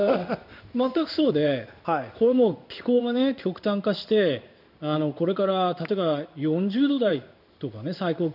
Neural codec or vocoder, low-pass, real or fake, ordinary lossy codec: codec, 16 kHz in and 24 kHz out, 1 kbps, XY-Tokenizer; 5.4 kHz; fake; none